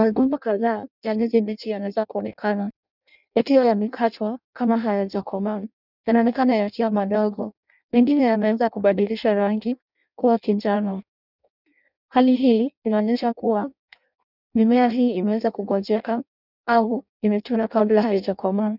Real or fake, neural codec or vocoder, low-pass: fake; codec, 16 kHz in and 24 kHz out, 0.6 kbps, FireRedTTS-2 codec; 5.4 kHz